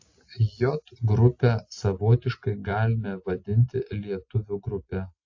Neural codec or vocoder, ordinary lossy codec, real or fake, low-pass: none; AAC, 48 kbps; real; 7.2 kHz